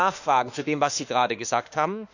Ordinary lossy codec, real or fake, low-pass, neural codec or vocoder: none; fake; 7.2 kHz; autoencoder, 48 kHz, 32 numbers a frame, DAC-VAE, trained on Japanese speech